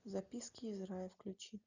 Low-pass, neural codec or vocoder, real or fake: 7.2 kHz; none; real